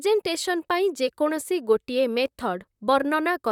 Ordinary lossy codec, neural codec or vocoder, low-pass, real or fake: none; vocoder, 44.1 kHz, 128 mel bands, Pupu-Vocoder; 19.8 kHz; fake